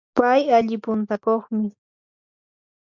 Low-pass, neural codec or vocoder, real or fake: 7.2 kHz; none; real